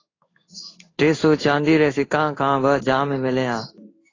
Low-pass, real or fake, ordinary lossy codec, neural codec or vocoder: 7.2 kHz; fake; AAC, 32 kbps; codec, 16 kHz in and 24 kHz out, 1 kbps, XY-Tokenizer